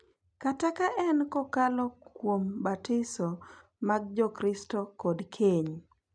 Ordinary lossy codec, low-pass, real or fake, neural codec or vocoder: none; 9.9 kHz; real; none